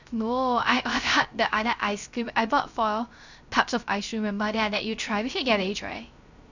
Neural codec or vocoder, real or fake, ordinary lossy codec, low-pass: codec, 16 kHz, 0.3 kbps, FocalCodec; fake; none; 7.2 kHz